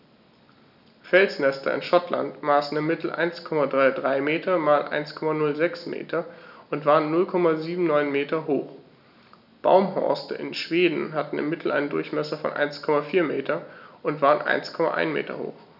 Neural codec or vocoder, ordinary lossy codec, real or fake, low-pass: none; none; real; 5.4 kHz